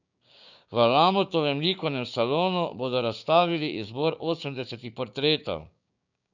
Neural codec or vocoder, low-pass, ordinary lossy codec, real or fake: codec, 16 kHz, 6 kbps, DAC; 7.2 kHz; none; fake